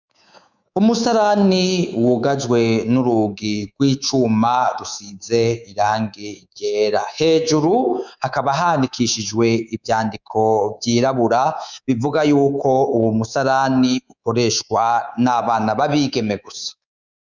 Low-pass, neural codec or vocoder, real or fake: 7.2 kHz; codec, 24 kHz, 3.1 kbps, DualCodec; fake